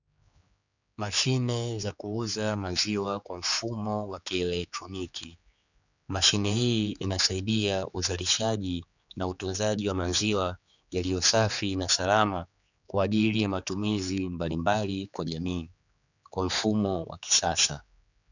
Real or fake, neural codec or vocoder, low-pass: fake; codec, 16 kHz, 4 kbps, X-Codec, HuBERT features, trained on general audio; 7.2 kHz